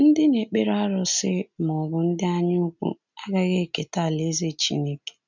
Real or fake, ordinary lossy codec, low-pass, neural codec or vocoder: real; none; 7.2 kHz; none